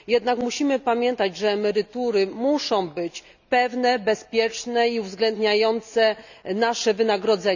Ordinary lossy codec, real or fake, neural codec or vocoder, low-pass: none; real; none; 7.2 kHz